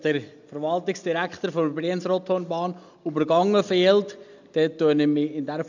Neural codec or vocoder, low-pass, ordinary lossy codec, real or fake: none; 7.2 kHz; none; real